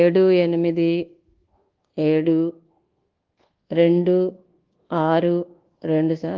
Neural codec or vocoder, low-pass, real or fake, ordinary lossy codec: codec, 24 kHz, 1.2 kbps, DualCodec; 7.2 kHz; fake; Opus, 16 kbps